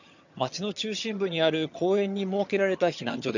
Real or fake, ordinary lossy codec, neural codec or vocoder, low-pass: fake; none; vocoder, 22.05 kHz, 80 mel bands, HiFi-GAN; 7.2 kHz